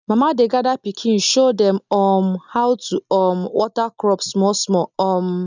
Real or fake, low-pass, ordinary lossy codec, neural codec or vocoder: real; 7.2 kHz; none; none